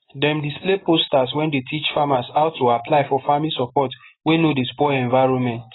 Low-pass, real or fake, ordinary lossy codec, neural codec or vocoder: 7.2 kHz; real; AAC, 16 kbps; none